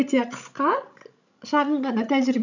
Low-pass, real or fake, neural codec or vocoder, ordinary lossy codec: 7.2 kHz; fake; codec, 16 kHz, 16 kbps, FreqCodec, larger model; none